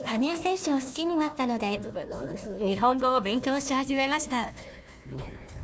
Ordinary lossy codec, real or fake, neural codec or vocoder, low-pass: none; fake; codec, 16 kHz, 1 kbps, FunCodec, trained on Chinese and English, 50 frames a second; none